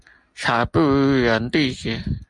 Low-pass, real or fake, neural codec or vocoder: 10.8 kHz; real; none